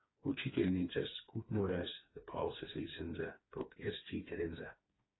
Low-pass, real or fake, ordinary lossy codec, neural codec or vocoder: 7.2 kHz; fake; AAC, 16 kbps; codec, 16 kHz, 4 kbps, FreqCodec, smaller model